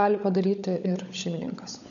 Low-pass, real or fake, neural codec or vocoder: 7.2 kHz; fake; codec, 16 kHz, 16 kbps, FunCodec, trained on LibriTTS, 50 frames a second